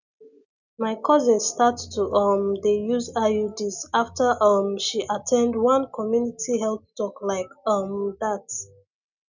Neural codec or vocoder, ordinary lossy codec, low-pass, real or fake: none; none; 7.2 kHz; real